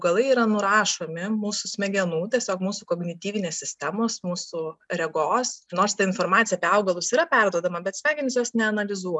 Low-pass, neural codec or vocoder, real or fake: 10.8 kHz; none; real